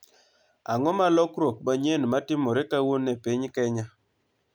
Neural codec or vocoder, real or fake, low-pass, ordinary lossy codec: none; real; none; none